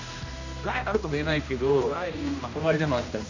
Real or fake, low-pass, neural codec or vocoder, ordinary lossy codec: fake; 7.2 kHz; codec, 16 kHz, 1 kbps, X-Codec, HuBERT features, trained on general audio; none